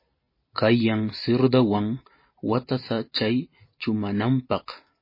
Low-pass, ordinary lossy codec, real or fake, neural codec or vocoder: 5.4 kHz; MP3, 24 kbps; real; none